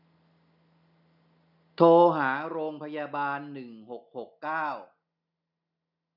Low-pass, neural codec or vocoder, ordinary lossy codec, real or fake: 5.4 kHz; none; none; real